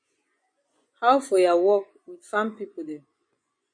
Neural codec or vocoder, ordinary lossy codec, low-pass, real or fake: vocoder, 24 kHz, 100 mel bands, Vocos; MP3, 48 kbps; 9.9 kHz; fake